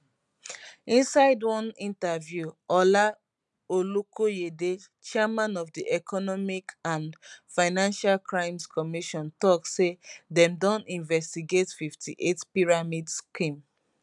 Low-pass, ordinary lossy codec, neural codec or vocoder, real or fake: 10.8 kHz; none; none; real